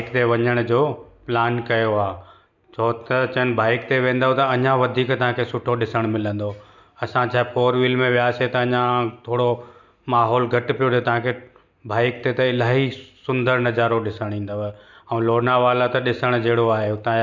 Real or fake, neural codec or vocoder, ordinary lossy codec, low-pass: real; none; none; 7.2 kHz